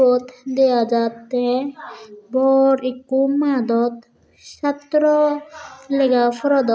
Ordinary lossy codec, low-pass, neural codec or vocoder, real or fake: none; none; none; real